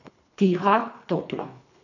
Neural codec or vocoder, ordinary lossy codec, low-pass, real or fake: codec, 24 kHz, 3 kbps, HILCodec; none; 7.2 kHz; fake